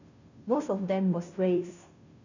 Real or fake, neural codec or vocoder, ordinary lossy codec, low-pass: fake; codec, 16 kHz, 0.5 kbps, FunCodec, trained on Chinese and English, 25 frames a second; none; 7.2 kHz